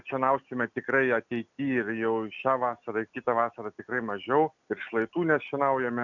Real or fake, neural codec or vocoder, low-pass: real; none; 7.2 kHz